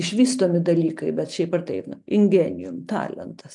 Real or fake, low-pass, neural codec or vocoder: real; 10.8 kHz; none